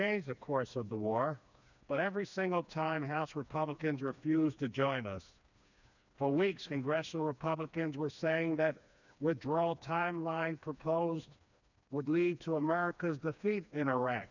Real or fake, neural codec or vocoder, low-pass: fake; codec, 16 kHz, 2 kbps, FreqCodec, smaller model; 7.2 kHz